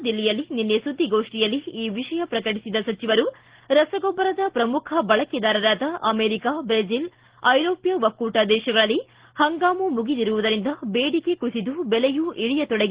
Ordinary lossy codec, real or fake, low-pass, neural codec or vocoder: Opus, 16 kbps; real; 3.6 kHz; none